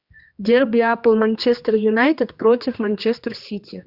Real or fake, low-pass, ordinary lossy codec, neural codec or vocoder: fake; 5.4 kHz; Opus, 64 kbps; codec, 16 kHz, 2 kbps, X-Codec, HuBERT features, trained on general audio